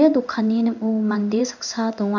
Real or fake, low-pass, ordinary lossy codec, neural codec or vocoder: fake; 7.2 kHz; none; codec, 16 kHz in and 24 kHz out, 1 kbps, XY-Tokenizer